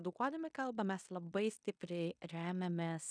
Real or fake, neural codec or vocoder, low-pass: fake; codec, 16 kHz in and 24 kHz out, 0.9 kbps, LongCat-Audio-Codec, fine tuned four codebook decoder; 9.9 kHz